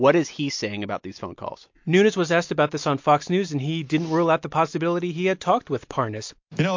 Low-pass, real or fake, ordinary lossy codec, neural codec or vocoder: 7.2 kHz; real; MP3, 48 kbps; none